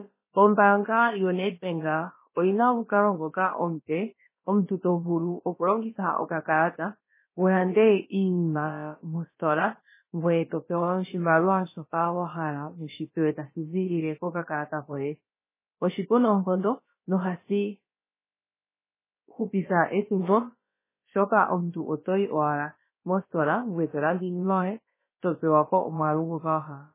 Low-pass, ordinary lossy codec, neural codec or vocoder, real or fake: 3.6 kHz; MP3, 16 kbps; codec, 16 kHz, about 1 kbps, DyCAST, with the encoder's durations; fake